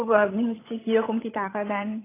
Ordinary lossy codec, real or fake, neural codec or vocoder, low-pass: AAC, 16 kbps; fake; codec, 16 kHz, 16 kbps, FreqCodec, larger model; 3.6 kHz